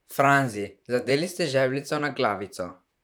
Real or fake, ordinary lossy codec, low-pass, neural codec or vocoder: fake; none; none; vocoder, 44.1 kHz, 128 mel bands, Pupu-Vocoder